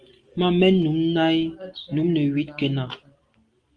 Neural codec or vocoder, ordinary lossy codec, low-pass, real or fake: none; Opus, 24 kbps; 9.9 kHz; real